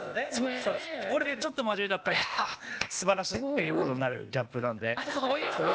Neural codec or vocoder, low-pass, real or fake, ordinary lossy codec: codec, 16 kHz, 0.8 kbps, ZipCodec; none; fake; none